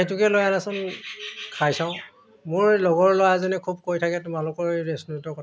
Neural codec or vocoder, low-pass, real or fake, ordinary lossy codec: none; none; real; none